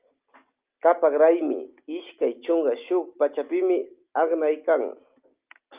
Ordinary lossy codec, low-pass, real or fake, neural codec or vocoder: Opus, 24 kbps; 3.6 kHz; real; none